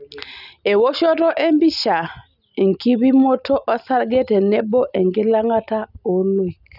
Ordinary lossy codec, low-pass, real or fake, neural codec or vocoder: none; 5.4 kHz; real; none